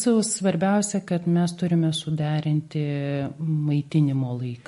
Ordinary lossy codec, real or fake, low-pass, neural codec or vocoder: MP3, 48 kbps; real; 14.4 kHz; none